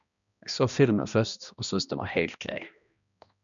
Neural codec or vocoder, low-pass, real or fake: codec, 16 kHz, 1 kbps, X-Codec, HuBERT features, trained on balanced general audio; 7.2 kHz; fake